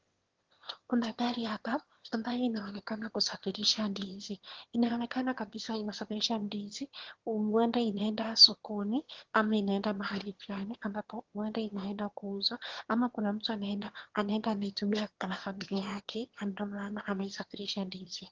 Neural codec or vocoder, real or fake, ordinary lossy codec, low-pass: autoencoder, 22.05 kHz, a latent of 192 numbers a frame, VITS, trained on one speaker; fake; Opus, 16 kbps; 7.2 kHz